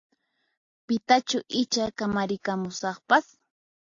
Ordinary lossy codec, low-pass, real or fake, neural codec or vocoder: AAC, 48 kbps; 7.2 kHz; real; none